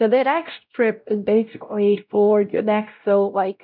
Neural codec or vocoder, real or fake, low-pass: codec, 16 kHz, 0.5 kbps, X-Codec, WavLM features, trained on Multilingual LibriSpeech; fake; 5.4 kHz